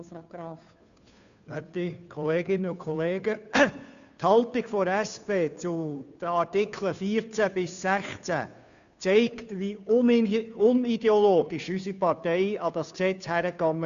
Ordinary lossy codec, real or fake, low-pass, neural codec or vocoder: none; fake; 7.2 kHz; codec, 16 kHz, 2 kbps, FunCodec, trained on Chinese and English, 25 frames a second